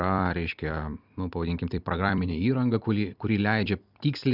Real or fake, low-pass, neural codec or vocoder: fake; 5.4 kHz; vocoder, 44.1 kHz, 128 mel bands every 256 samples, BigVGAN v2